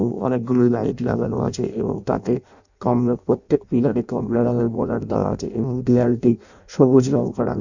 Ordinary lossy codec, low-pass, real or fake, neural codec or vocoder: none; 7.2 kHz; fake; codec, 16 kHz in and 24 kHz out, 0.6 kbps, FireRedTTS-2 codec